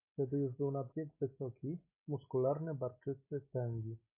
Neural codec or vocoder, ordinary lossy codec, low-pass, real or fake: none; AAC, 24 kbps; 3.6 kHz; real